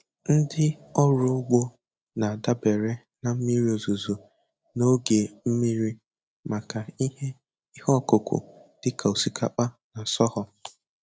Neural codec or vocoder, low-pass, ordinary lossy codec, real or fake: none; none; none; real